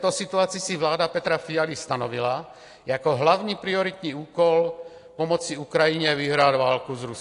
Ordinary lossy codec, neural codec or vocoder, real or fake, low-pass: AAC, 48 kbps; none; real; 10.8 kHz